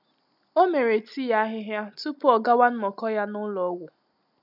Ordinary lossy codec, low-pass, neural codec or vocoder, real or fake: none; 5.4 kHz; none; real